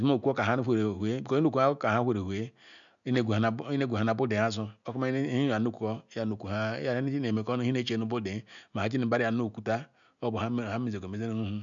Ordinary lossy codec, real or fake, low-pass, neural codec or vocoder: none; real; 7.2 kHz; none